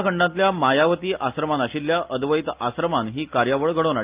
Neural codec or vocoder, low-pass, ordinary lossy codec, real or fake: none; 3.6 kHz; Opus, 32 kbps; real